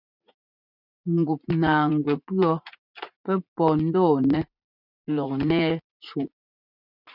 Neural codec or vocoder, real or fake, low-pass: vocoder, 44.1 kHz, 80 mel bands, Vocos; fake; 5.4 kHz